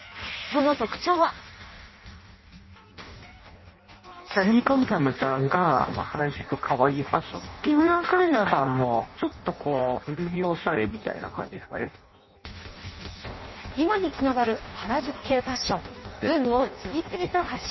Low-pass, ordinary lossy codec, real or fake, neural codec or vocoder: 7.2 kHz; MP3, 24 kbps; fake; codec, 16 kHz in and 24 kHz out, 0.6 kbps, FireRedTTS-2 codec